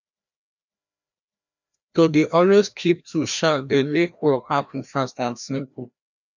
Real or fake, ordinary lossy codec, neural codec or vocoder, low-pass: fake; none; codec, 16 kHz, 1 kbps, FreqCodec, larger model; 7.2 kHz